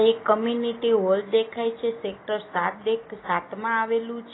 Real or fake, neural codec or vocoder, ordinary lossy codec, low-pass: real; none; AAC, 16 kbps; 7.2 kHz